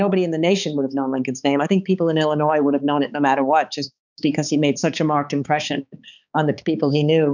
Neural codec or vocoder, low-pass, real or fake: codec, 16 kHz, 4 kbps, X-Codec, HuBERT features, trained on balanced general audio; 7.2 kHz; fake